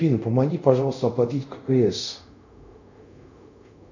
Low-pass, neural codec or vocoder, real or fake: 7.2 kHz; codec, 24 kHz, 0.5 kbps, DualCodec; fake